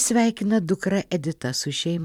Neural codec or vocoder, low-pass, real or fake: vocoder, 44.1 kHz, 128 mel bands every 256 samples, BigVGAN v2; 19.8 kHz; fake